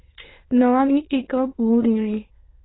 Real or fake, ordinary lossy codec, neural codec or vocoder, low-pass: fake; AAC, 16 kbps; autoencoder, 22.05 kHz, a latent of 192 numbers a frame, VITS, trained on many speakers; 7.2 kHz